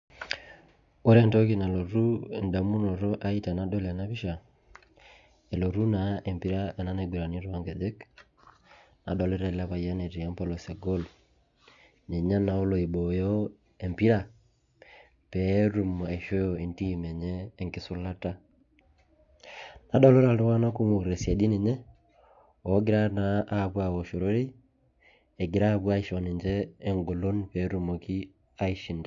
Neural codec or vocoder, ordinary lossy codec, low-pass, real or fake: none; AAC, 64 kbps; 7.2 kHz; real